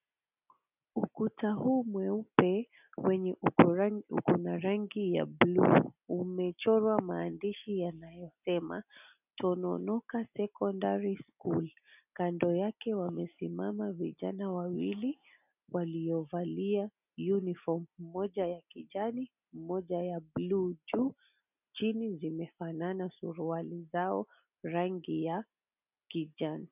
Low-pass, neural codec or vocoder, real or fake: 3.6 kHz; none; real